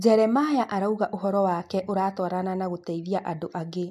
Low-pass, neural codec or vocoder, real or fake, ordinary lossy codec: 14.4 kHz; none; real; MP3, 64 kbps